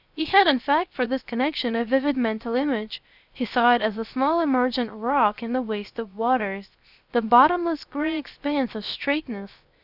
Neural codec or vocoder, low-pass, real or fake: codec, 16 kHz, 0.7 kbps, FocalCodec; 5.4 kHz; fake